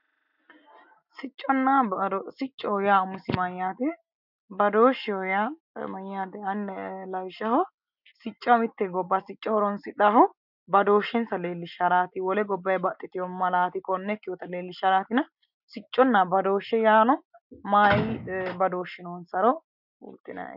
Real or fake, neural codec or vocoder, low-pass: real; none; 5.4 kHz